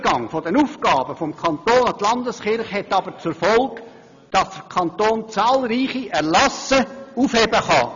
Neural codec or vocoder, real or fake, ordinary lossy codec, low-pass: none; real; none; 7.2 kHz